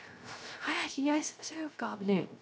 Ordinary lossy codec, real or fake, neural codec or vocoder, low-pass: none; fake; codec, 16 kHz, 0.3 kbps, FocalCodec; none